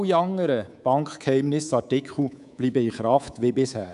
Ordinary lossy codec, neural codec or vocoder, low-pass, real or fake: none; codec, 24 kHz, 3.1 kbps, DualCodec; 10.8 kHz; fake